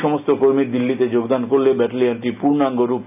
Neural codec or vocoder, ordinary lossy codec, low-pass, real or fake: none; none; 3.6 kHz; real